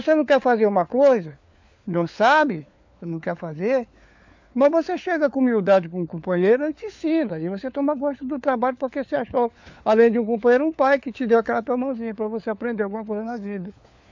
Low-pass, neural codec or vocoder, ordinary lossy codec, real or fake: 7.2 kHz; codec, 16 kHz, 4 kbps, FunCodec, trained on LibriTTS, 50 frames a second; MP3, 48 kbps; fake